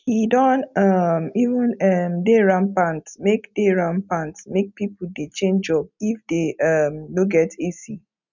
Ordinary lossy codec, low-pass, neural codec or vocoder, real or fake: none; 7.2 kHz; none; real